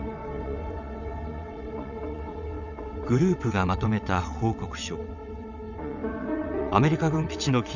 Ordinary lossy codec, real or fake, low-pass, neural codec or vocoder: none; fake; 7.2 kHz; vocoder, 22.05 kHz, 80 mel bands, WaveNeXt